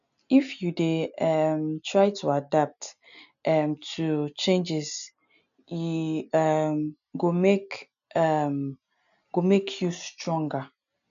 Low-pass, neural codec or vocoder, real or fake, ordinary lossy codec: 7.2 kHz; none; real; none